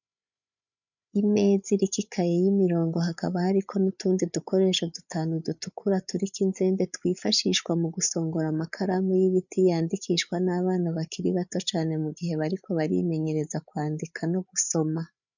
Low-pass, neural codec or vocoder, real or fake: 7.2 kHz; codec, 16 kHz, 8 kbps, FreqCodec, larger model; fake